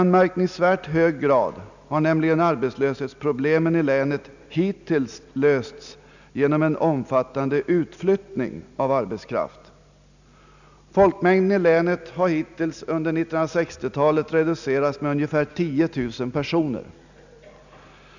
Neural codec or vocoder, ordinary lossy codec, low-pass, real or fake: none; none; 7.2 kHz; real